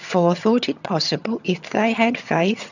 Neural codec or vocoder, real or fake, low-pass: vocoder, 22.05 kHz, 80 mel bands, HiFi-GAN; fake; 7.2 kHz